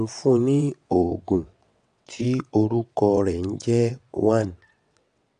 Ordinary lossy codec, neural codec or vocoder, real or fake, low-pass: MP3, 64 kbps; vocoder, 22.05 kHz, 80 mel bands, WaveNeXt; fake; 9.9 kHz